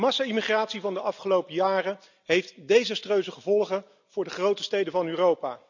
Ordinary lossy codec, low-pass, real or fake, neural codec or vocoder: none; 7.2 kHz; real; none